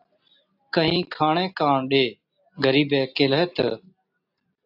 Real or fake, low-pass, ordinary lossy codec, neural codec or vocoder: real; 5.4 kHz; AAC, 48 kbps; none